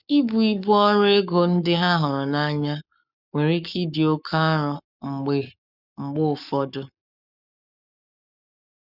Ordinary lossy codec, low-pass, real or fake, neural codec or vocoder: none; 5.4 kHz; fake; codec, 44.1 kHz, 7.8 kbps, Pupu-Codec